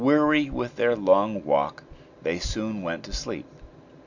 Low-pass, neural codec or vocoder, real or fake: 7.2 kHz; none; real